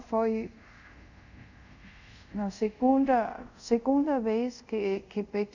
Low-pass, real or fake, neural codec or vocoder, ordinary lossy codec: 7.2 kHz; fake; codec, 24 kHz, 0.5 kbps, DualCodec; none